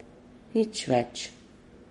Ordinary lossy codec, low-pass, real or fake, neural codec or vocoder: MP3, 48 kbps; 19.8 kHz; fake; codec, 44.1 kHz, 7.8 kbps, Pupu-Codec